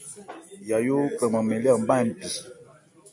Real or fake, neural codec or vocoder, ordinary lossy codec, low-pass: real; none; AAC, 64 kbps; 10.8 kHz